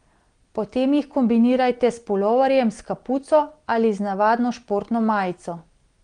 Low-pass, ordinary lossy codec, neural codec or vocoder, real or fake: 9.9 kHz; Opus, 24 kbps; none; real